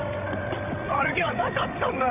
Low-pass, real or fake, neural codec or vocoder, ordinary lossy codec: 3.6 kHz; fake; codec, 16 kHz, 16 kbps, FreqCodec, larger model; none